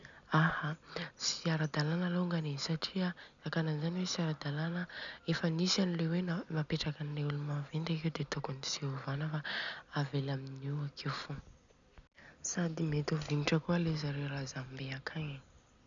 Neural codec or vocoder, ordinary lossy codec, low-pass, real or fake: none; none; 7.2 kHz; real